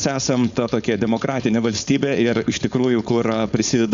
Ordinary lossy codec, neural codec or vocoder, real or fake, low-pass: Opus, 64 kbps; codec, 16 kHz, 4.8 kbps, FACodec; fake; 7.2 kHz